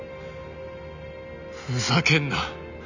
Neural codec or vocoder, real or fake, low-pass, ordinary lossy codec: none; real; 7.2 kHz; none